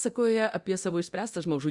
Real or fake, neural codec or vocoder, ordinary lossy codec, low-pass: fake; codec, 24 kHz, 0.9 kbps, DualCodec; Opus, 64 kbps; 10.8 kHz